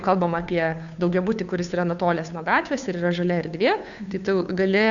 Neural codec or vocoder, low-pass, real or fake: codec, 16 kHz, 2 kbps, FunCodec, trained on Chinese and English, 25 frames a second; 7.2 kHz; fake